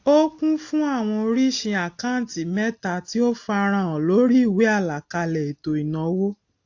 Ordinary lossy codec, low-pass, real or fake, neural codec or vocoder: AAC, 48 kbps; 7.2 kHz; real; none